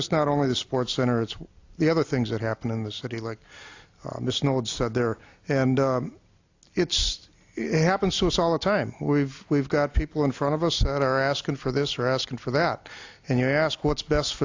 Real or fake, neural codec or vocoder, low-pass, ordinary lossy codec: real; none; 7.2 kHz; AAC, 48 kbps